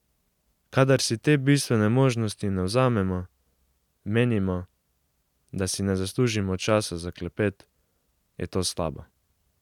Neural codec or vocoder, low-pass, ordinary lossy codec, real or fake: none; 19.8 kHz; none; real